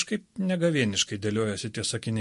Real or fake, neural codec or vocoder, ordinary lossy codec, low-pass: real; none; MP3, 48 kbps; 14.4 kHz